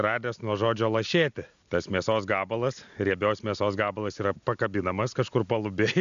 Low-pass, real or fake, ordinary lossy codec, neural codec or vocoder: 7.2 kHz; real; AAC, 96 kbps; none